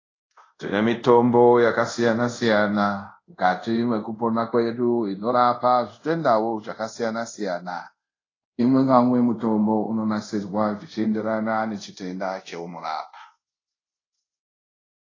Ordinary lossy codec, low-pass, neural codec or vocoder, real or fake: AAC, 32 kbps; 7.2 kHz; codec, 24 kHz, 0.5 kbps, DualCodec; fake